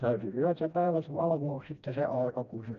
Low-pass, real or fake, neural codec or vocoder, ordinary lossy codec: 7.2 kHz; fake; codec, 16 kHz, 1 kbps, FreqCodec, smaller model; none